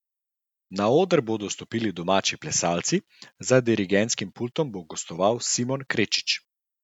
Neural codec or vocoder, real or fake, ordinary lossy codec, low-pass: none; real; none; 19.8 kHz